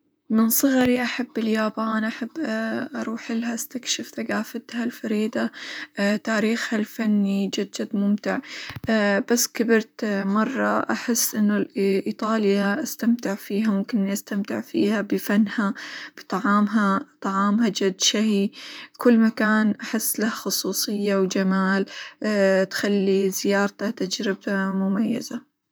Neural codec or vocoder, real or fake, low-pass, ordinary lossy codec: vocoder, 44.1 kHz, 128 mel bands, Pupu-Vocoder; fake; none; none